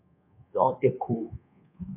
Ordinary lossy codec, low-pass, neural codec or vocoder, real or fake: MP3, 32 kbps; 3.6 kHz; codec, 24 kHz, 1.2 kbps, DualCodec; fake